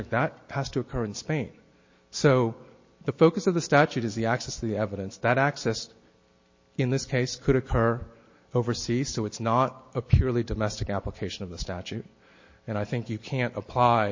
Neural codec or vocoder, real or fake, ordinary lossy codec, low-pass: none; real; MP3, 32 kbps; 7.2 kHz